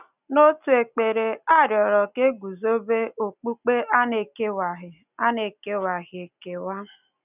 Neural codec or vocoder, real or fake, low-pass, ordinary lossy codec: none; real; 3.6 kHz; none